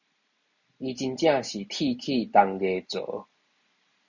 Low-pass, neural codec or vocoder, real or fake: 7.2 kHz; none; real